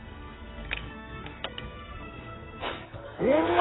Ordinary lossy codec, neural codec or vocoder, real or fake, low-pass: AAC, 16 kbps; codec, 44.1 kHz, 2.6 kbps, SNAC; fake; 7.2 kHz